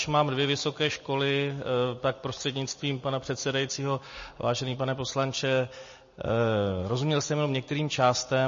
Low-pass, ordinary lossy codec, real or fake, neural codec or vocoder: 7.2 kHz; MP3, 32 kbps; real; none